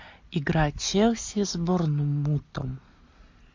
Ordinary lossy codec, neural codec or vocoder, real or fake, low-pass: MP3, 64 kbps; none; real; 7.2 kHz